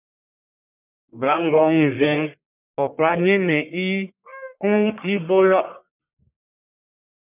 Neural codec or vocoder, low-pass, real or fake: codec, 44.1 kHz, 1.7 kbps, Pupu-Codec; 3.6 kHz; fake